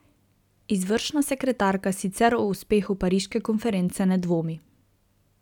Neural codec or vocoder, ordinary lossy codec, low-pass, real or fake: none; none; 19.8 kHz; real